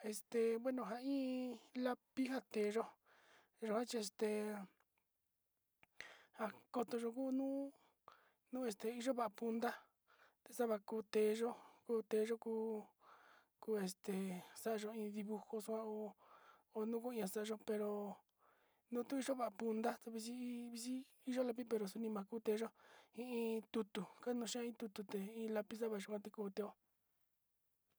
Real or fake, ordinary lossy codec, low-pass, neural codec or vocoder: real; none; none; none